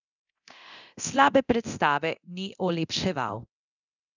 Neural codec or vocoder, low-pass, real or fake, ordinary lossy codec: codec, 24 kHz, 0.9 kbps, DualCodec; 7.2 kHz; fake; none